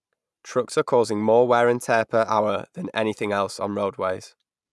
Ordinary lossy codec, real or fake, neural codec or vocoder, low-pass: none; real; none; none